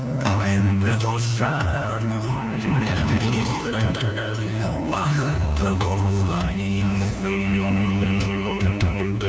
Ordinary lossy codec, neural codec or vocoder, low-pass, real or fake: none; codec, 16 kHz, 1 kbps, FunCodec, trained on LibriTTS, 50 frames a second; none; fake